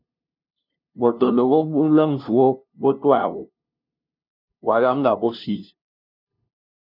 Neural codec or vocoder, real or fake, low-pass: codec, 16 kHz, 0.5 kbps, FunCodec, trained on LibriTTS, 25 frames a second; fake; 5.4 kHz